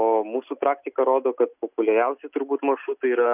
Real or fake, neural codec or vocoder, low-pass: real; none; 3.6 kHz